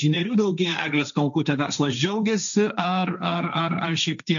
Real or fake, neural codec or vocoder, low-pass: fake; codec, 16 kHz, 1.1 kbps, Voila-Tokenizer; 7.2 kHz